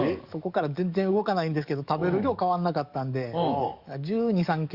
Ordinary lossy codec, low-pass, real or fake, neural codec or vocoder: Opus, 64 kbps; 5.4 kHz; fake; codec, 44.1 kHz, 7.8 kbps, DAC